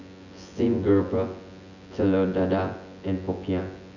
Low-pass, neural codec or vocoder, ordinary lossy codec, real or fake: 7.2 kHz; vocoder, 24 kHz, 100 mel bands, Vocos; none; fake